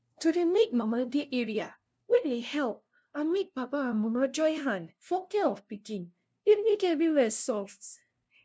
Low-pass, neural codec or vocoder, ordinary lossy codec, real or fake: none; codec, 16 kHz, 0.5 kbps, FunCodec, trained on LibriTTS, 25 frames a second; none; fake